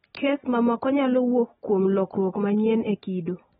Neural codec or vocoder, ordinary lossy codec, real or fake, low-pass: none; AAC, 16 kbps; real; 14.4 kHz